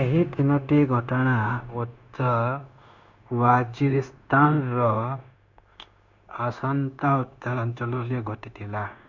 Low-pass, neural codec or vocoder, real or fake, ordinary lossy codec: 7.2 kHz; codec, 16 kHz, 0.9 kbps, LongCat-Audio-Codec; fake; MP3, 64 kbps